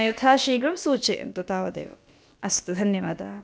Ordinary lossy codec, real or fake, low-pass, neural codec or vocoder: none; fake; none; codec, 16 kHz, about 1 kbps, DyCAST, with the encoder's durations